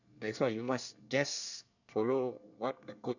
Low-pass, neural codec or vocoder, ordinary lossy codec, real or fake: 7.2 kHz; codec, 24 kHz, 1 kbps, SNAC; none; fake